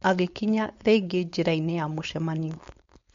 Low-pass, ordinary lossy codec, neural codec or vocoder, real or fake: 7.2 kHz; MP3, 48 kbps; codec, 16 kHz, 4.8 kbps, FACodec; fake